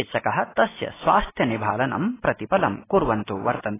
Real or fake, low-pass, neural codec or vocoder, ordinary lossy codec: real; 3.6 kHz; none; AAC, 16 kbps